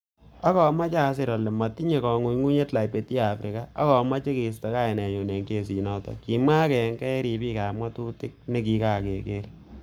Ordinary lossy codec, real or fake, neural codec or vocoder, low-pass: none; fake; codec, 44.1 kHz, 7.8 kbps, Pupu-Codec; none